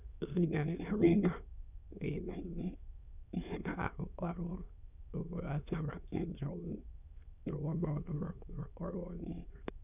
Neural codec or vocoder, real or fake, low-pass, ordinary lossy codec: autoencoder, 22.05 kHz, a latent of 192 numbers a frame, VITS, trained on many speakers; fake; 3.6 kHz; none